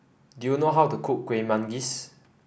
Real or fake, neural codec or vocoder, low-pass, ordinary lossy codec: real; none; none; none